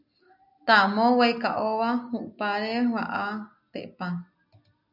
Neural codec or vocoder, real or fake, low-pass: none; real; 5.4 kHz